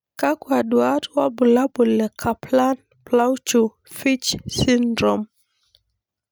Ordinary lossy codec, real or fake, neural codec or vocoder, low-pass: none; real; none; none